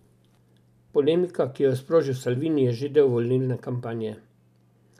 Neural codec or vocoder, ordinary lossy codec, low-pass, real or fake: none; none; 14.4 kHz; real